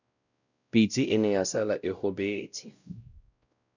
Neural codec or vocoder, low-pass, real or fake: codec, 16 kHz, 0.5 kbps, X-Codec, WavLM features, trained on Multilingual LibriSpeech; 7.2 kHz; fake